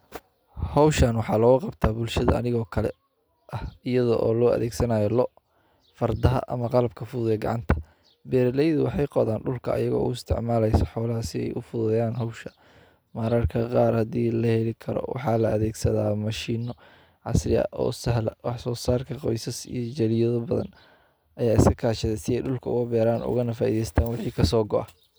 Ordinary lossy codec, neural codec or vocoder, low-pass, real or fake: none; none; none; real